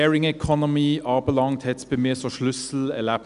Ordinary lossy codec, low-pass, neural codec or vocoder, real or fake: none; 10.8 kHz; none; real